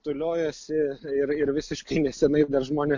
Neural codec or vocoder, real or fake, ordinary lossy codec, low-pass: none; real; MP3, 48 kbps; 7.2 kHz